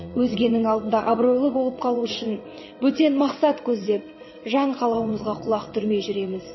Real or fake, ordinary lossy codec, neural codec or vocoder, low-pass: fake; MP3, 24 kbps; vocoder, 22.05 kHz, 80 mel bands, WaveNeXt; 7.2 kHz